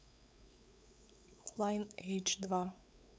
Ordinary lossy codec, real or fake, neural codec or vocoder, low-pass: none; fake; codec, 16 kHz, 4 kbps, X-Codec, WavLM features, trained on Multilingual LibriSpeech; none